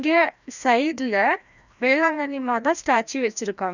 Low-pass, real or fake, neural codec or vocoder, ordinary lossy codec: 7.2 kHz; fake; codec, 16 kHz, 1 kbps, FreqCodec, larger model; none